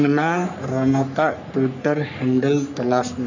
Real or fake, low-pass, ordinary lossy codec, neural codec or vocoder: fake; 7.2 kHz; none; codec, 44.1 kHz, 3.4 kbps, Pupu-Codec